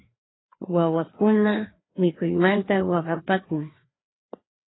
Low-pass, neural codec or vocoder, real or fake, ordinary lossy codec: 7.2 kHz; codec, 16 kHz, 2 kbps, FreqCodec, larger model; fake; AAC, 16 kbps